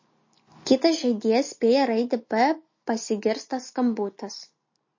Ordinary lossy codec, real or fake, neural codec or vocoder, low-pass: MP3, 32 kbps; real; none; 7.2 kHz